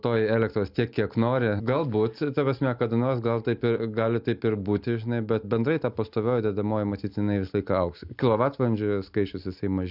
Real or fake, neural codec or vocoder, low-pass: real; none; 5.4 kHz